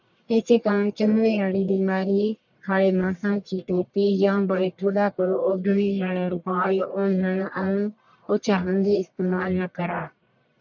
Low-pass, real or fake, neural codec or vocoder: 7.2 kHz; fake; codec, 44.1 kHz, 1.7 kbps, Pupu-Codec